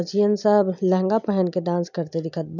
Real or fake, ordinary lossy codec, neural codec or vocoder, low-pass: real; none; none; 7.2 kHz